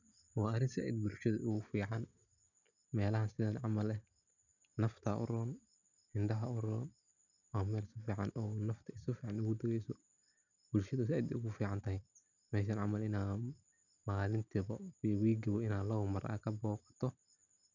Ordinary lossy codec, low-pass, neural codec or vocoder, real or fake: none; 7.2 kHz; none; real